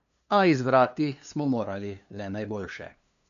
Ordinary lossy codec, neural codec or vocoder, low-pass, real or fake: none; codec, 16 kHz, 2 kbps, FunCodec, trained on LibriTTS, 25 frames a second; 7.2 kHz; fake